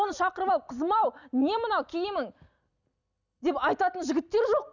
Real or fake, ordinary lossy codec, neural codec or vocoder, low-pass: real; none; none; 7.2 kHz